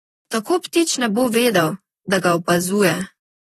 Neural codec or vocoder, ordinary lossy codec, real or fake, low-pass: vocoder, 44.1 kHz, 128 mel bands, Pupu-Vocoder; AAC, 32 kbps; fake; 19.8 kHz